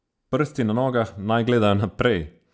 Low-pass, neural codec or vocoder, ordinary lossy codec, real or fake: none; none; none; real